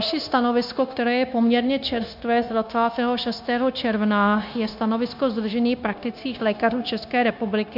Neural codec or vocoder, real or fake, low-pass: codec, 16 kHz, 0.9 kbps, LongCat-Audio-Codec; fake; 5.4 kHz